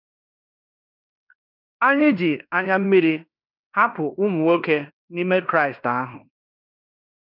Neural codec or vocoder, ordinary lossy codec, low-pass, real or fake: codec, 16 kHz in and 24 kHz out, 0.9 kbps, LongCat-Audio-Codec, fine tuned four codebook decoder; none; 5.4 kHz; fake